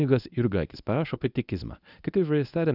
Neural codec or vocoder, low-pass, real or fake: codec, 24 kHz, 0.9 kbps, WavTokenizer, medium speech release version 1; 5.4 kHz; fake